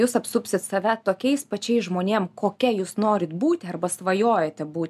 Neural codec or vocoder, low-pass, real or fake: none; 14.4 kHz; real